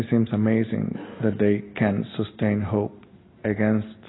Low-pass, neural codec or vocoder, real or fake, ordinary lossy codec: 7.2 kHz; none; real; AAC, 16 kbps